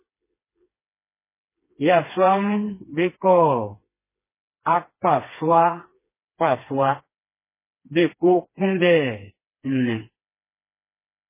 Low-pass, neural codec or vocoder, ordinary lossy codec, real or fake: 3.6 kHz; codec, 16 kHz, 2 kbps, FreqCodec, smaller model; MP3, 16 kbps; fake